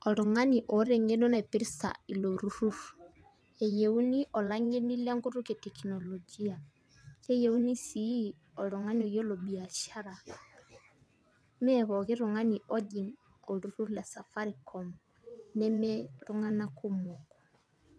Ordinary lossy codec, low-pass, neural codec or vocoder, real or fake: none; none; vocoder, 22.05 kHz, 80 mel bands, WaveNeXt; fake